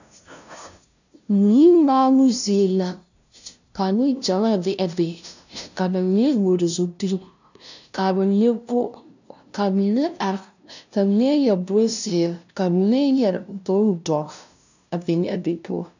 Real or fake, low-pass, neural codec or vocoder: fake; 7.2 kHz; codec, 16 kHz, 0.5 kbps, FunCodec, trained on LibriTTS, 25 frames a second